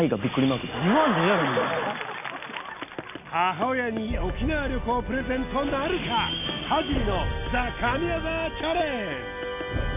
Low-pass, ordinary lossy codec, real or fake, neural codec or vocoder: 3.6 kHz; none; real; none